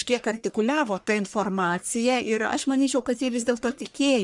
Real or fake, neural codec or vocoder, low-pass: fake; codec, 44.1 kHz, 1.7 kbps, Pupu-Codec; 10.8 kHz